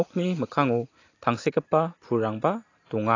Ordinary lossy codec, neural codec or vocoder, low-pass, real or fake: AAC, 32 kbps; none; 7.2 kHz; real